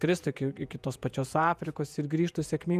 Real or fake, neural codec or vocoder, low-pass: fake; vocoder, 48 kHz, 128 mel bands, Vocos; 14.4 kHz